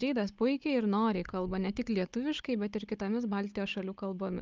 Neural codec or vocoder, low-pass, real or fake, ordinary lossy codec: codec, 16 kHz, 16 kbps, FunCodec, trained on Chinese and English, 50 frames a second; 7.2 kHz; fake; Opus, 24 kbps